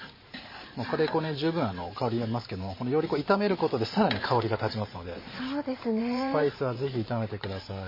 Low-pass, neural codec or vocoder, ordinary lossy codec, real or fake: 5.4 kHz; vocoder, 44.1 kHz, 128 mel bands every 512 samples, BigVGAN v2; MP3, 24 kbps; fake